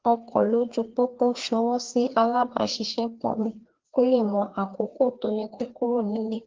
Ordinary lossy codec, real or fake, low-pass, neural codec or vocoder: Opus, 16 kbps; fake; 7.2 kHz; codec, 16 kHz, 2 kbps, FreqCodec, larger model